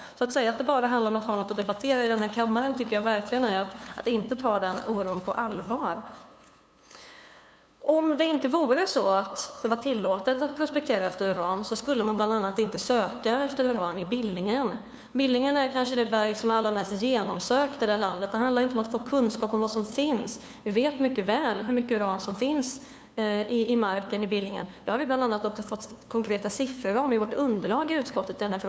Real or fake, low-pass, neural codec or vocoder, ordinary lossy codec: fake; none; codec, 16 kHz, 2 kbps, FunCodec, trained on LibriTTS, 25 frames a second; none